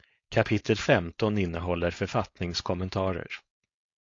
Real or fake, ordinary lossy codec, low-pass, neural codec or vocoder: fake; AAC, 48 kbps; 7.2 kHz; codec, 16 kHz, 4.8 kbps, FACodec